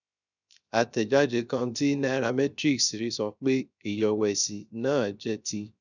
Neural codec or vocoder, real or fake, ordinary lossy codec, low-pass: codec, 16 kHz, 0.3 kbps, FocalCodec; fake; none; 7.2 kHz